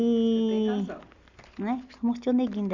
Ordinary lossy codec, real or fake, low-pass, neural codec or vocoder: Opus, 64 kbps; real; 7.2 kHz; none